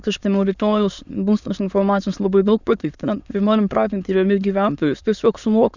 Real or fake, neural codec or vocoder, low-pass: fake; autoencoder, 22.05 kHz, a latent of 192 numbers a frame, VITS, trained on many speakers; 7.2 kHz